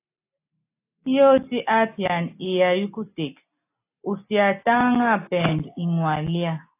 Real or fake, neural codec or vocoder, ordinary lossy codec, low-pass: real; none; AAC, 24 kbps; 3.6 kHz